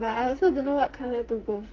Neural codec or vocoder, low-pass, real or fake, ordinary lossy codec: codec, 44.1 kHz, 2.6 kbps, SNAC; 7.2 kHz; fake; Opus, 24 kbps